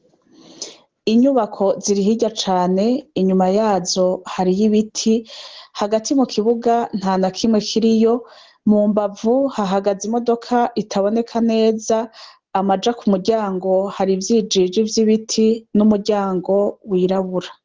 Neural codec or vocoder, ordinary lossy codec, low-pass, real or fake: none; Opus, 16 kbps; 7.2 kHz; real